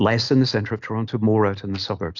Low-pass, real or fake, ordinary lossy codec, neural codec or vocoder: 7.2 kHz; real; Opus, 64 kbps; none